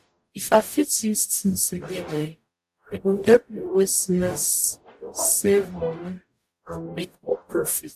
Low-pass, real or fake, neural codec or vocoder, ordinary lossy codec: 14.4 kHz; fake; codec, 44.1 kHz, 0.9 kbps, DAC; AAC, 96 kbps